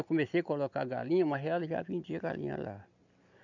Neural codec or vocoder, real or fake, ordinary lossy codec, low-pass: none; real; none; 7.2 kHz